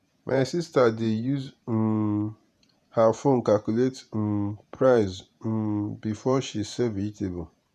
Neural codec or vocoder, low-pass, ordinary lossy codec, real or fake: vocoder, 48 kHz, 128 mel bands, Vocos; 14.4 kHz; none; fake